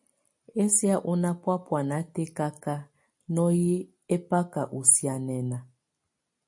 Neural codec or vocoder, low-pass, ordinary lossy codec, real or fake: none; 10.8 kHz; MP3, 48 kbps; real